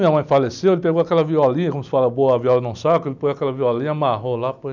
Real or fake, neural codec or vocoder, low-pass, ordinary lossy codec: real; none; 7.2 kHz; none